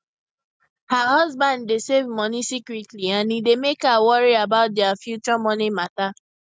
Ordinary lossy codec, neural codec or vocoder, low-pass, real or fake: none; none; none; real